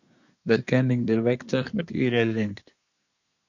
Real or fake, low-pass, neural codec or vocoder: fake; 7.2 kHz; codec, 24 kHz, 1 kbps, SNAC